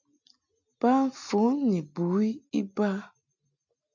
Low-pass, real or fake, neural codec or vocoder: 7.2 kHz; real; none